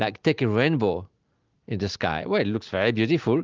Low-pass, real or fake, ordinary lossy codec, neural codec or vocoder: 7.2 kHz; real; Opus, 32 kbps; none